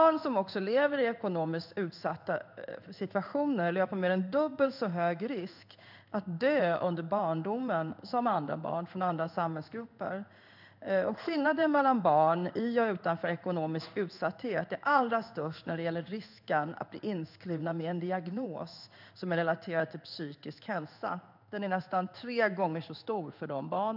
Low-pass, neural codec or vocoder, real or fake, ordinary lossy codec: 5.4 kHz; codec, 16 kHz in and 24 kHz out, 1 kbps, XY-Tokenizer; fake; none